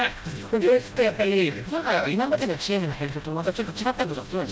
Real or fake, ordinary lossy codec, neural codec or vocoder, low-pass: fake; none; codec, 16 kHz, 0.5 kbps, FreqCodec, smaller model; none